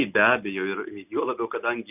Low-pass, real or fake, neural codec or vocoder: 3.6 kHz; real; none